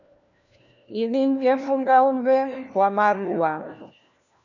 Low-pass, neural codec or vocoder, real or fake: 7.2 kHz; codec, 16 kHz, 1 kbps, FunCodec, trained on LibriTTS, 50 frames a second; fake